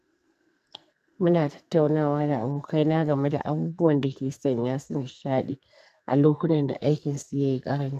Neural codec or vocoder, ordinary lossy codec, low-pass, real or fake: codec, 44.1 kHz, 2.6 kbps, SNAC; none; 14.4 kHz; fake